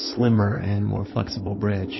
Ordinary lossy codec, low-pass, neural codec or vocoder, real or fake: MP3, 24 kbps; 7.2 kHz; codec, 16 kHz in and 24 kHz out, 2.2 kbps, FireRedTTS-2 codec; fake